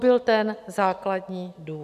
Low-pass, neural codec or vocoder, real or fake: 14.4 kHz; none; real